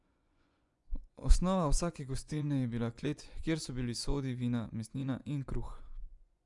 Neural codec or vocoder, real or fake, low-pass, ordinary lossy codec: vocoder, 24 kHz, 100 mel bands, Vocos; fake; 10.8 kHz; Opus, 64 kbps